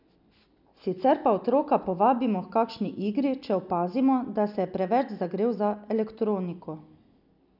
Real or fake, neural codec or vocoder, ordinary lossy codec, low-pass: real; none; none; 5.4 kHz